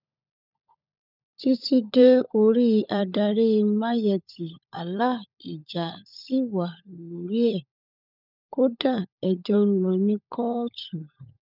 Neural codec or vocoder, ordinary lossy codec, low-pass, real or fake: codec, 16 kHz, 16 kbps, FunCodec, trained on LibriTTS, 50 frames a second; none; 5.4 kHz; fake